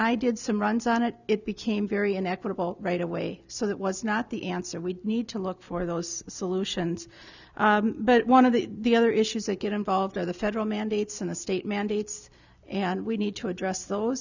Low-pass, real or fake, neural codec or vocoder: 7.2 kHz; real; none